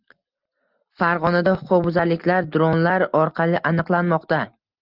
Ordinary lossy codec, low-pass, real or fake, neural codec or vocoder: Opus, 32 kbps; 5.4 kHz; real; none